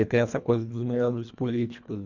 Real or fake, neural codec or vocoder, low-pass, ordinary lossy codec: fake; codec, 24 kHz, 1.5 kbps, HILCodec; 7.2 kHz; none